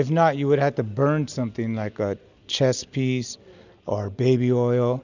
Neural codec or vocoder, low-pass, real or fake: none; 7.2 kHz; real